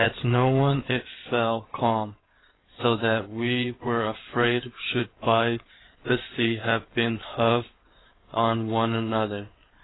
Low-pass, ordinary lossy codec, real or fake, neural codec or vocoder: 7.2 kHz; AAC, 16 kbps; fake; codec, 16 kHz in and 24 kHz out, 2.2 kbps, FireRedTTS-2 codec